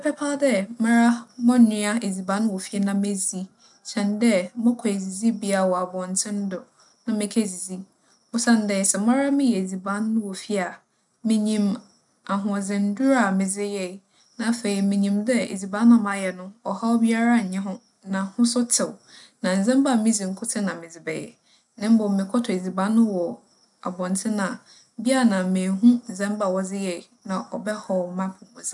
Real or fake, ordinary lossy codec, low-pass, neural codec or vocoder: real; none; 10.8 kHz; none